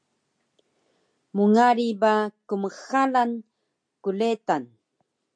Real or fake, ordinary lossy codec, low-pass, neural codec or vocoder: real; MP3, 64 kbps; 9.9 kHz; none